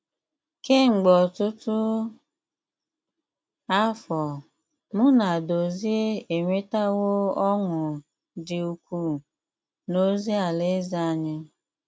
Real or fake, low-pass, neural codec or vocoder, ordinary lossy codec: real; none; none; none